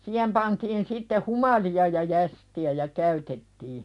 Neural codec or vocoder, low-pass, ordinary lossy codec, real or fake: none; 10.8 kHz; none; real